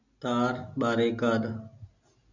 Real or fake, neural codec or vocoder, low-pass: real; none; 7.2 kHz